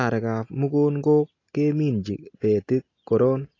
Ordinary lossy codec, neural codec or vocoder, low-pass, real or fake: AAC, 32 kbps; none; 7.2 kHz; real